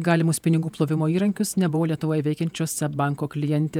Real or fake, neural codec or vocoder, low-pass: fake; vocoder, 48 kHz, 128 mel bands, Vocos; 19.8 kHz